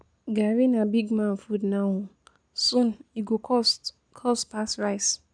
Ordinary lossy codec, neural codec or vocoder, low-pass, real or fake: none; none; 9.9 kHz; real